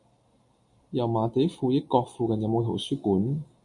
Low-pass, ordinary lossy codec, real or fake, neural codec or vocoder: 10.8 kHz; MP3, 64 kbps; real; none